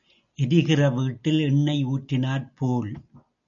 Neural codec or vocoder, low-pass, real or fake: none; 7.2 kHz; real